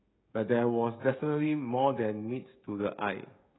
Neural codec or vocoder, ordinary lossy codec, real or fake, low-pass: codec, 16 kHz, 16 kbps, FreqCodec, smaller model; AAC, 16 kbps; fake; 7.2 kHz